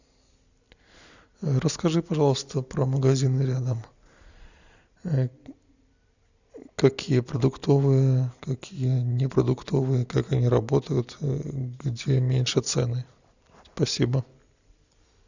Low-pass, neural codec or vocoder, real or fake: 7.2 kHz; none; real